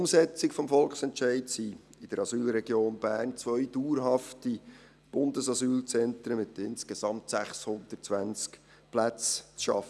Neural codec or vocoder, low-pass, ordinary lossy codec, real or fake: none; none; none; real